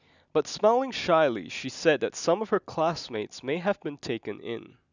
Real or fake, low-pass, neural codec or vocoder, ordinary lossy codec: real; 7.2 kHz; none; none